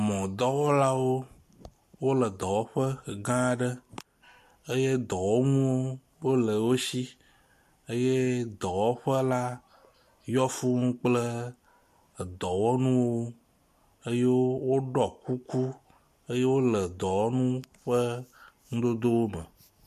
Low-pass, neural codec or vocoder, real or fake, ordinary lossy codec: 14.4 kHz; none; real; MP3, 64 kbps